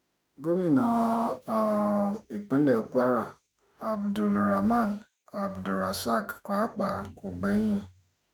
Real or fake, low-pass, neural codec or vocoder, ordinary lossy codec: fake; none; autoencoder, 48 kHz, 32 numbers a frame, DAC-VAE, trained on Japanese speech; none